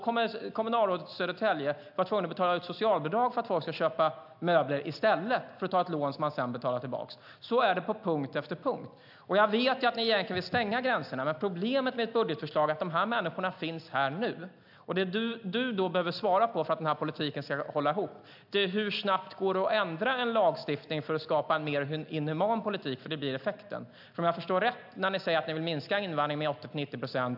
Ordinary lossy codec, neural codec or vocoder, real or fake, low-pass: AAC, 48 kbps; none; real; 5.4 kHz